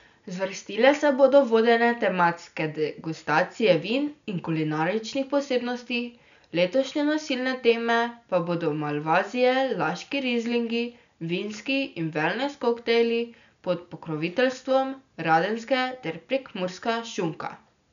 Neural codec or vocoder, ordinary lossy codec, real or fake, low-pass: none; none; real; 7.2 kHz